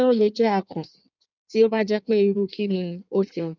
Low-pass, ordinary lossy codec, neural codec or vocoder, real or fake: 7.2 kHz; none; codec, 16 kHz in and 24 kHz out, 1.1 kbps, FireRedTTS-2 codec; fake